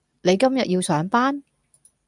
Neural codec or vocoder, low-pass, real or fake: none; 10.8 kHz; real